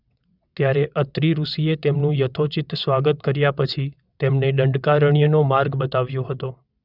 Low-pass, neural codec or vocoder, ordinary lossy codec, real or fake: 5.4 kHz; vocoder, 22.05 kHz, 80 mel bands, WaveNeXt; none; fake